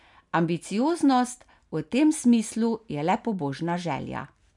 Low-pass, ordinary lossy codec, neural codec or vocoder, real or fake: 10.8 kHz; none; none; real